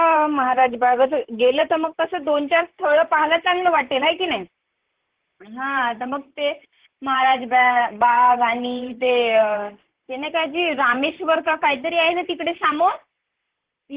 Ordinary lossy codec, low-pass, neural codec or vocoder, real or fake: Opus, 16 kbps; 3.6 kHz; vocoder, 44.1 kHz, 128 mel bands, Pupu-Vocoder; fake